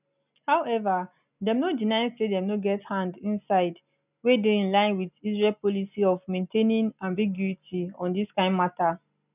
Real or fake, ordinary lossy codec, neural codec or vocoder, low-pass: real; none; none; 3.6 kHz